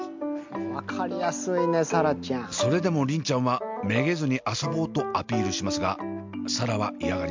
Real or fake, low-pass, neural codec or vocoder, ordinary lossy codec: real; 7.2 kHz; none; MP3, 64 kbps